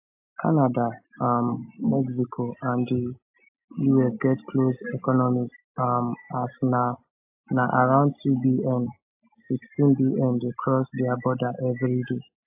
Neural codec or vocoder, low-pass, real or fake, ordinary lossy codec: none; 3.6 kHz; real; AAC, 32 kbps